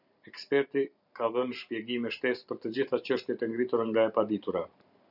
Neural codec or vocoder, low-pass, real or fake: vocoder, 44.1 kHz, 128 mel bands every 512 samples, BigVGAN v2; 5.4 kHz; fake